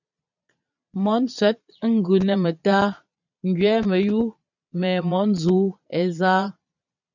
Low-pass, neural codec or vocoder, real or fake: 7.2 kHz; vocoder, 22.05 kHz, 80 mel bands, Vocos; fake